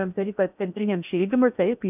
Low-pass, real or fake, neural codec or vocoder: 3.6 kHz; fake; codec, 16 kHz in and 24 kHz out, 0.8 kbps, FocalCodec, streaming, 65536 codes